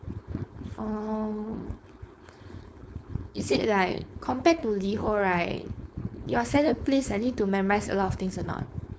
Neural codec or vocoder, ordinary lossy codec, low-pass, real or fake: codec, 16 kHz, 4.8 kbps, FACodec; none; none; fake